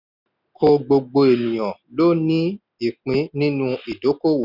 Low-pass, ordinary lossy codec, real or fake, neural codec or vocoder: 5.4 kHz; none; real; none